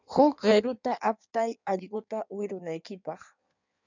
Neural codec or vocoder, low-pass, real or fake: codec, 16 kHz in and 24 kHz out, 1.1 kbps, FireRedTTS-2 codec; 7.2 kHz; fake